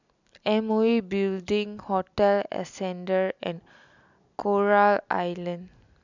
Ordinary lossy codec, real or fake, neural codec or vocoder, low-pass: none; real; none; 7.2 kHz